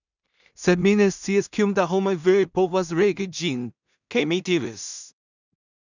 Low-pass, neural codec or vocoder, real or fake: 7.2 kHz; codec, 16 kHz in and 24 kHz out, 0.4 kbps, LongCat-Audio-Codec, two codebook decoder; fake